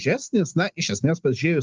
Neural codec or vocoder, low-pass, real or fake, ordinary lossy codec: none; 7.2 kHz; real; Opus, 24 kbps